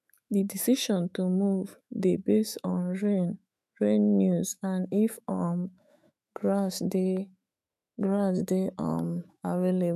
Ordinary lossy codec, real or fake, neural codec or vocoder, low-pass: none; fake; autoencoder, 48 kHz, 128 numbers a frame, DAC-VAE, trained on Japanese speech; 14.4 kHz